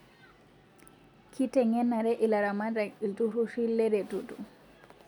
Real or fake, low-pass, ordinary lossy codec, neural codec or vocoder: real; 19.8 kHz; none; none